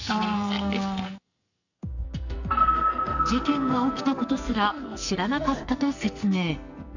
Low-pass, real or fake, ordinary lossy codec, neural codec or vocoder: 7.2 kHz; fake; none; codec, 44.1 kHz, 2.6 kbps, SNAC